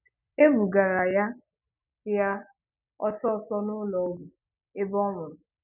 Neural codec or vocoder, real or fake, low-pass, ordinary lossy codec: none; real; 3.6 kHz; none